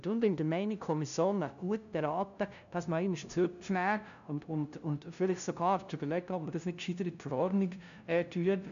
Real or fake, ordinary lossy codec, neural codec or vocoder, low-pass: fake; none; codec, 16 kHz, 0.5 kbps, FunCodec, trained on LibriTTS, 25 frames a second; 7.2 kHz